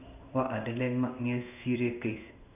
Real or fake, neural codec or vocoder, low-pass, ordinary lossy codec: fake; codec, 16 kHz in and 24 kHz out, 1 kbps, XY-Tokenizer; 3.6 kHz; none